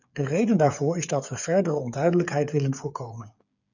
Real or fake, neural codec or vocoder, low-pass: fake; codec, 16 kHz, 8 kbps, FreqCodec, smaller model; 7.2 kHz